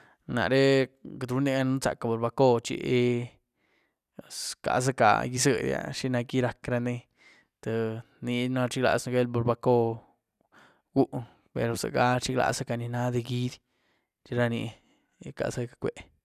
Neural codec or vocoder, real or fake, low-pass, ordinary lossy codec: none; real; 14.4 kHz; none